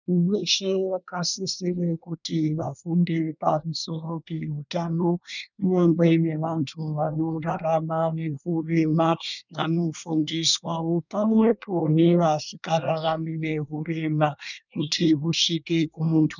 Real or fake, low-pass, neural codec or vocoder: fake; 7.2 kHz; codec, 24 kHz, 1 kbps, SNAC